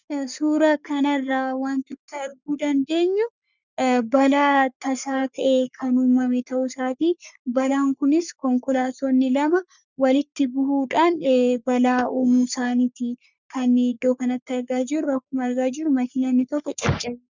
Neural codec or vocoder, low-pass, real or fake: codec, 44.1 kHz, 3.4 kbps, Pupu-Codec; 7.2 kHz; fake